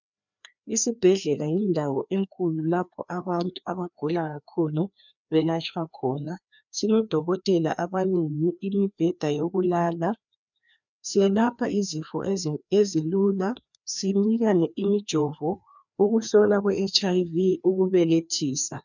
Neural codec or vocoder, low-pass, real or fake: codec, 16 kHz, 2 kbps, FreqCodec, larger model; 7.2 kHz; fake